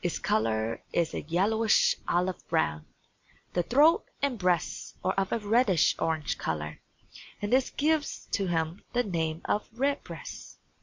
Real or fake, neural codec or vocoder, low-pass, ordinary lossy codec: real; none; 7.2 kHz; MP3, 64 kbps